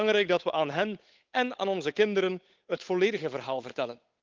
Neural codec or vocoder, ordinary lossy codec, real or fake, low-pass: codec, 16 kHz, 8 kbps, FunCodec, trained on Chinese and English, 25 frames a second; Opus, 24 kbps; fake; 7.2 kHz